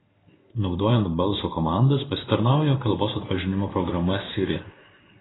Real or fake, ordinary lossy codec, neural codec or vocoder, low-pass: fake; AAC, 16 kbps; codec, 16 kHz in and 24 kHz out, 1 kbps, XY-Tokenizer; 7.2 kHz